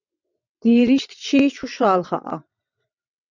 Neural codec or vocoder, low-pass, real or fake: vocoder, 22.05 kHz, 80 mel bands, WaveNeXt; 7.2 kHz; fake